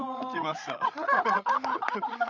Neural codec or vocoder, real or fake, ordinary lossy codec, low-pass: codec, 16 kHz, 16 kbps, FreqCodec, larger model; fake; none; none